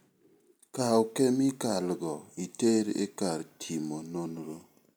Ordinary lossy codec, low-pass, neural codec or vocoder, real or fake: none; none; none; real